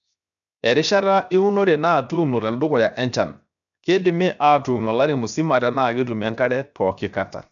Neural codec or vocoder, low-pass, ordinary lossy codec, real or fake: codec, 16 kHz, 0.7 kbps, FocalCodec; 7.2 kHz; none; fake